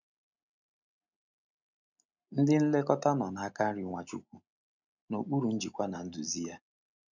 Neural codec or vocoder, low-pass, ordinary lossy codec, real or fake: none; 7.2 kHz; none; real